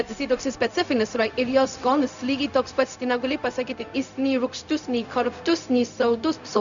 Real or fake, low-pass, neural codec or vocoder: fake; 7.2 kHz; codec, 16 kHz, 0.4 kbps, LongCat-Audio-Codec